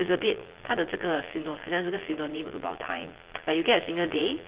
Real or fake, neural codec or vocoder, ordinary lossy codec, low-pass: fake; vocoder, 22.05 kHz, 80 mel bands, Vocos; Opus, 16 kbps; 3.6 kHz